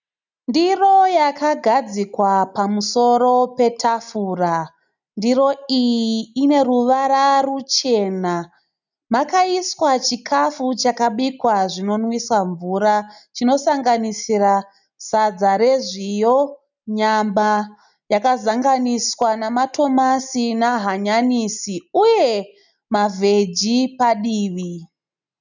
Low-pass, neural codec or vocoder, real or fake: 7.2 kHz; none; real